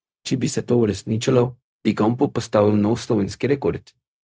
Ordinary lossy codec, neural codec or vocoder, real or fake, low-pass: none; codec, 16 kHz, 0.4 kbps, LongCat-Audio-Codec; fake; none